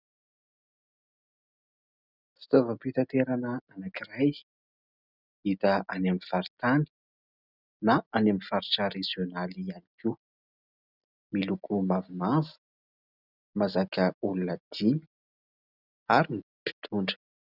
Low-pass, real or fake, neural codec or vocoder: 5.4 kHz; real; none